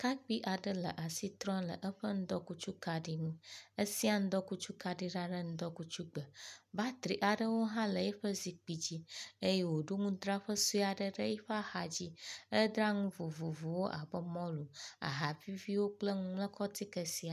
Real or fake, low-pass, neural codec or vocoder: real; 14.4 kHz; none